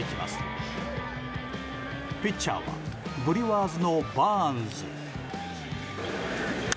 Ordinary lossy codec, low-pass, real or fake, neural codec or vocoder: none; none; real; none